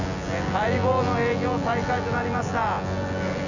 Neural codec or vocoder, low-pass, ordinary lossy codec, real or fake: vocoder, 24 kHz, 100 mel bands, Vocos; 7.2 kHz; none; fake